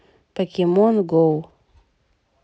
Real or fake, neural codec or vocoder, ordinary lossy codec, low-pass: real; none; none; none